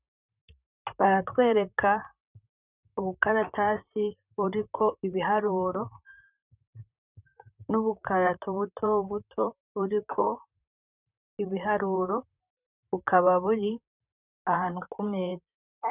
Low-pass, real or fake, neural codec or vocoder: 3.6 kHz; fake; vocoder, 44.1 kHz, 128 mel bands, Pupu-Vocoder